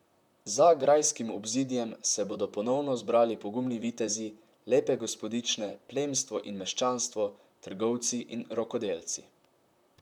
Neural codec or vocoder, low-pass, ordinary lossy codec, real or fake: vocoder, 44.1 kHz, 128 mel bands, Pupu-Vocoder; 19.8 kHz; none; fake